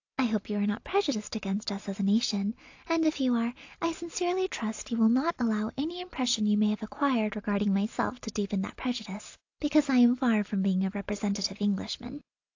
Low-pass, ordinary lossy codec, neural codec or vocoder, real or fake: 7.2 kHz; AAC, 48 kbps; none; real